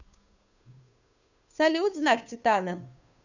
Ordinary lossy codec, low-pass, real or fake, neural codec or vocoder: none; 7.2 kHz; fake; codec, 16 kHz, 2 kbps, FunCodec, trained on Chinese and English, 25 frames a second